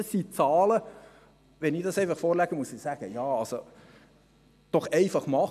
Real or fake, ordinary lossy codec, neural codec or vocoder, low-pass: real; none; none; 14.4 kHz